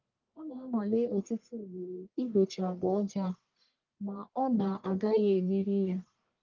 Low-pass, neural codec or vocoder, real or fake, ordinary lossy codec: 7.2 kHz; codec, 44.1 kHz, 1.7 kbps, Pupu-Codec; fake; Opus, 32 kbps